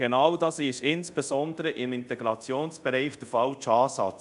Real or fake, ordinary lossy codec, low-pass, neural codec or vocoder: fake; MP3, 96 kbps; 10.8 kHz; codec, 24 kHz, 0.5 kbps, DualCodec